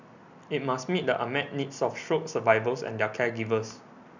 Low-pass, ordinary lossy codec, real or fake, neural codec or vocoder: 7.2 kHz; none; real; none